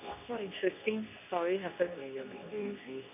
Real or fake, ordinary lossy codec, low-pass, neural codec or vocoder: fake; none; 3.6 kHz; codec, 24 kHz, 0.9 kbps, WavTokenizer, medium speech release version 2